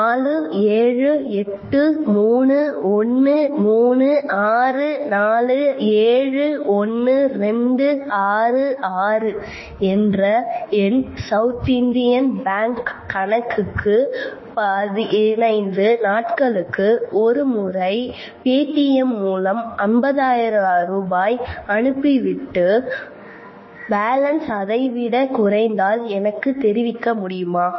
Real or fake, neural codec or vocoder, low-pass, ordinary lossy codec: fake; autoencoder, 48 kHz, 32 numbers a frame, DAC-VAE, trained on Japanese speech; 7.2 kHz; MP3, 24 kbps